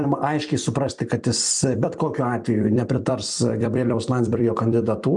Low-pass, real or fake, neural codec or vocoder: 10.8 kHz; fake; vocoder, 44.1 kHz, 128 mel bands every 256 samples, BigVGAN v2